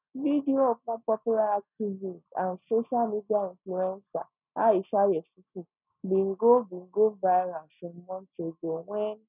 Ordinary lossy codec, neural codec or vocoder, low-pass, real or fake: none; none; 3.6 kHz; real